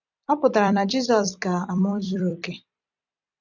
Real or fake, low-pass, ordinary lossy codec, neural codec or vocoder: fake; 7.2 kHz; none; vocoder, 44.1 kHz, 128 mel bands every 256 samples, BigVGAN v2